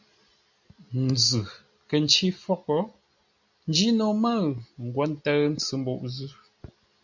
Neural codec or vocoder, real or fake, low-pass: none; real; 7.2 kHz